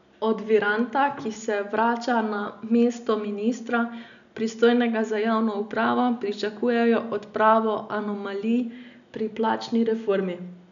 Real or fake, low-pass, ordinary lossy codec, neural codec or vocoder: real; 7.2 kHz; none; none